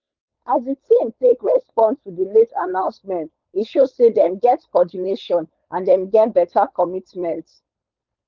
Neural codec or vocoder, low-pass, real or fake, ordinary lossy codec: codec, 16 kHz, 4.8 kbps, FACodec; 7.2 kHz; fake; Opus, 32 kbps